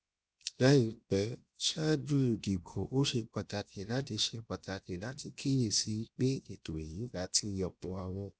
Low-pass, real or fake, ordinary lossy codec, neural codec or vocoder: none; fake; none; codec, 16 kHz, 0.7 kbps, FocalCodec